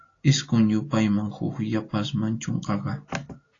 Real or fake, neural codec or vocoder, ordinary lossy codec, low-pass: real; none; AAC, 32 kbps; 7.2 kHz